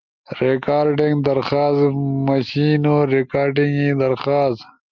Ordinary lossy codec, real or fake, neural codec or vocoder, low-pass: Opus, 24 kbps; real; none; 7.2 kHz